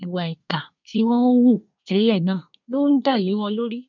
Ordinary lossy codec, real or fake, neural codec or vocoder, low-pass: none; fake; codec, 24 kHz, 1 kbps, SNAC; 7.2 kHz